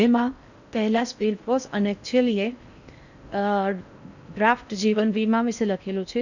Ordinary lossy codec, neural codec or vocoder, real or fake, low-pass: none; codec, 16 kHz in and 24 kHz out, 0.6 kbps, FocalCodec, streaming, 4096 codes; fake; 7.2 kHz